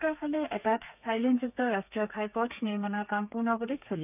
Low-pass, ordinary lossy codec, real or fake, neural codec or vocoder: 3.6 kHz; none; fake; codec, 32 kHz, 1.9 kbps, SNAC